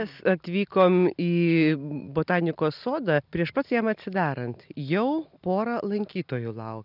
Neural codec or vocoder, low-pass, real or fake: none; 5.4 kHz; real